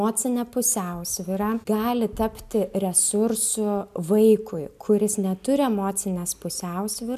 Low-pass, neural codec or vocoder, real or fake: 14.4 kHz; none; real